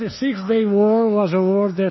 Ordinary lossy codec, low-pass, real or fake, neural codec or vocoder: MP3, 24 kbps; 7.2 kHz; fake; codec, 24 kHz, 1.2 kbps, DualCodec